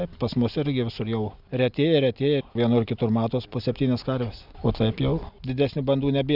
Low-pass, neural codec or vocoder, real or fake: 5.4 kHz; none; real